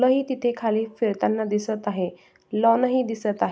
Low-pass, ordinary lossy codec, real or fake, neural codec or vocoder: none; none; real; none